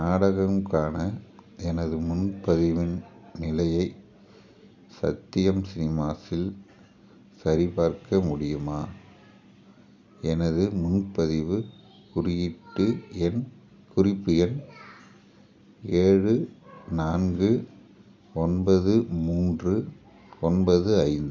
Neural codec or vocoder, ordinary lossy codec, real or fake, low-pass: none; none; real; none